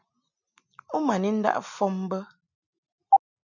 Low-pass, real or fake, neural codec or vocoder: 7.2 kHz; real; none